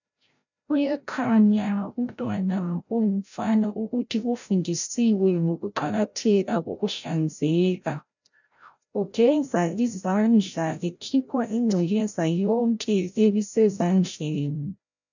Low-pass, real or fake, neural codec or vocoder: 7.2 kHz; fake; codec, 16 kHz, 0.5 kbps, FreqCodec, larger model